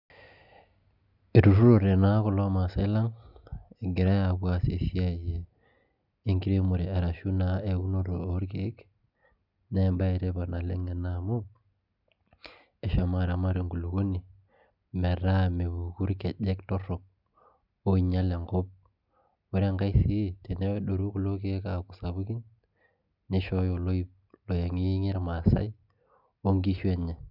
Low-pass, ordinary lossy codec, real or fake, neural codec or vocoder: 5.4 kHz; none; real; none